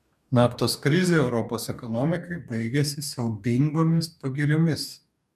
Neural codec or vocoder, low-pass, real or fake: codec, 44.1 kHz, 2.6 kbps, DAC; 14.4 kHz; fake